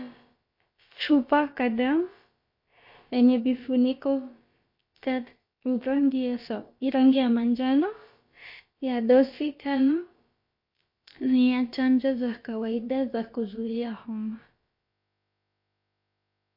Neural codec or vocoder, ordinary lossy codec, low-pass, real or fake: codec, 16 kHz, about 1 kbps, DyCAST, with the encoder's durations; MP3, 32 kbps; 5.4 kHz; fake